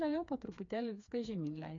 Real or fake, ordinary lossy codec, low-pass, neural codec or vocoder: fake; MP3, 64 kbps; 7.2 kHz; codec, 16 kHz, 4 kbps, FreqCodec, smaller model